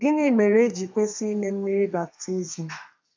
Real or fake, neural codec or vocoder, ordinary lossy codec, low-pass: fake; codec, 32 kHz, 1.9 kbps, SNAC; MP3, 64 kbps; 7.2 kHz